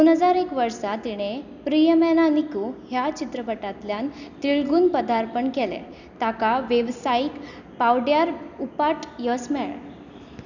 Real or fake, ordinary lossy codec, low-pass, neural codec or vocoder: real; none; 7.2 kHz; none